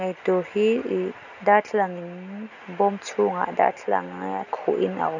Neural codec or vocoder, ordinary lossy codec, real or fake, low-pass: none; none; real; 7.2 kHz